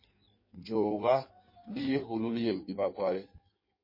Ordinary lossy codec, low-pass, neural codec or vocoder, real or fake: MP3, 24 kbps; 5.4 kHz; codec, 16 kHz in and 24 kHz out, 1.1 kbps, FireRedTTS-2 codec; fake